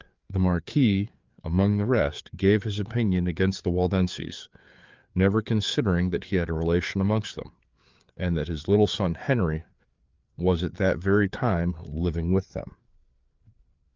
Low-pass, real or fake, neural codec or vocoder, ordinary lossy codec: 7.2 kHz; fake; codec, 16 kHz, 4 kbps, FreqCodec, larger model; Opus, 32 kbps